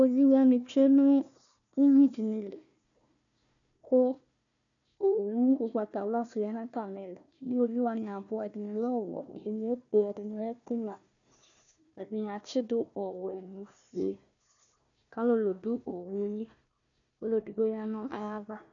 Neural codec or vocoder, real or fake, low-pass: codec, 16 kHz, 1 kbps, FunCodec, trained on Chinese and English, 50 frames a second; fake; 7.2 kHz